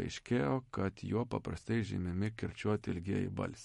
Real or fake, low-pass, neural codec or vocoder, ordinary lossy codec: real; 19.8 kHz; none; MP3, 48 kbps